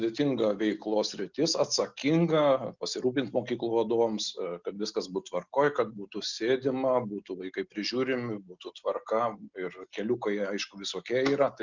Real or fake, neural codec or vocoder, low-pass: real; none; 7.2 kHz